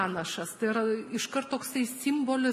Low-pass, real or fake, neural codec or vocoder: 14.4 kHz; real; none